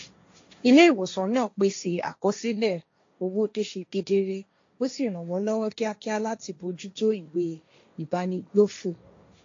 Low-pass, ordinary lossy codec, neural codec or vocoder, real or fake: 7.2 kHz; AAC, 48 kbps; codec, 16 kHz, 1.1 kbps, Voila-Tokenizer; fake